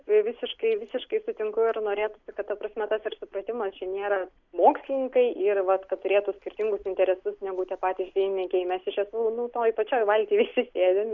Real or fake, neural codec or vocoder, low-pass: real; none; 7.2 kHz